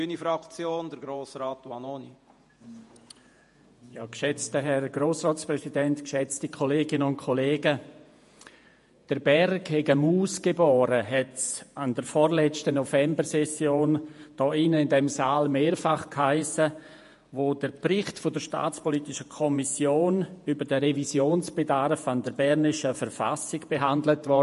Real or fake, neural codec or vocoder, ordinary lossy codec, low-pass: fake; vocoder, 48 kHz, 128 mel bands, Vocos; MP3, 48 kbps; 14.4 kHz